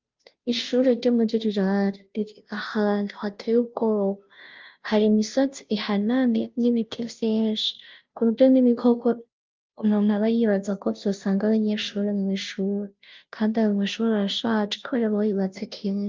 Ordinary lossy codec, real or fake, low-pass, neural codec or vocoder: Opus, 32 kbps; fake; 7.2 kHz; codec, 16 kHz, 0.5 kbps, FunCodec, trained on Chinese and English, 25 frames a second